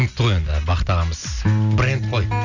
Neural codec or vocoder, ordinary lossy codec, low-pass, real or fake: none; none; 7.2 kHz; real